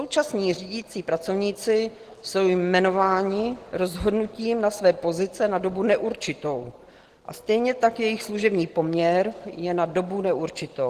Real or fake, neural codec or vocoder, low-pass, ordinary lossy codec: real; none; 14.4 kHz; Opus, 16 kbps